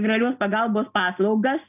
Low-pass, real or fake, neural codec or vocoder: 3.6 kHz; real; none